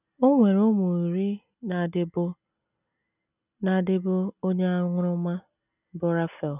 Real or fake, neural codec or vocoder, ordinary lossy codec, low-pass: real; none; none; 3.6 kHz